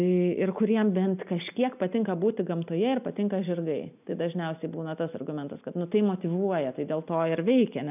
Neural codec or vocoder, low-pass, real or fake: none; 3.6 kHz; real